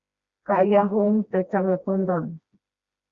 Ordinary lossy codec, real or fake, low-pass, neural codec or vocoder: Opus, 64 kbps; fake; 7.2 kHz; codec, 16 kHz, 1 kbps, FreqCodec, smaller model